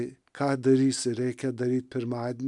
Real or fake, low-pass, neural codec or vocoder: real; 10.8 kHz; none